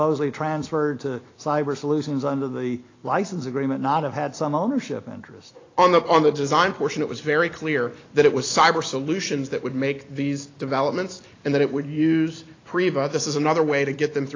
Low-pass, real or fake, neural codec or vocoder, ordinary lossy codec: 7.2 kHz; real; none; AAC, 32 kbps